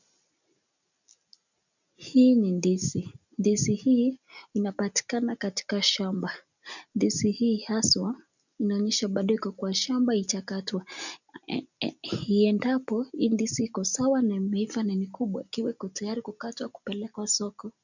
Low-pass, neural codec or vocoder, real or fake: 7.2 kHz; none; real